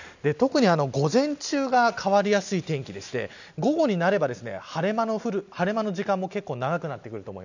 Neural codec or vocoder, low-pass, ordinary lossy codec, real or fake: none; 7.2 kHz; none; real